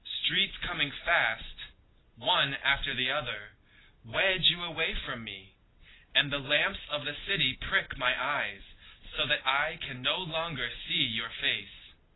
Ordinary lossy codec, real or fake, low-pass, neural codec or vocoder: AAC, 16 kbps; real; 7.2 kHz; none